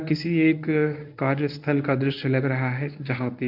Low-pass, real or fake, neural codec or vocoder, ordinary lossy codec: 5.4 kHz; fake; codec, 24 kHz, 0.9 kbps, WavTokenizer, medium speech release version 1; none